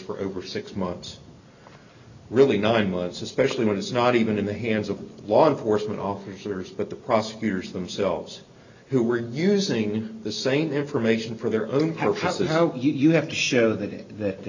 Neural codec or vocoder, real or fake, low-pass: none; real; 7.2 kHz